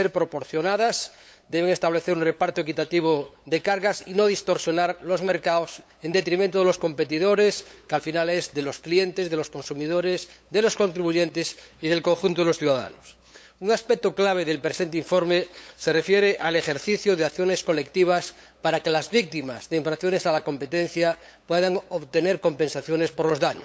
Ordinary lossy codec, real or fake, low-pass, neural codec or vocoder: none; fake; none; codec, 16 kHz, 8 kbps, FunCodec, trained on LibriTTS, 25 frames a second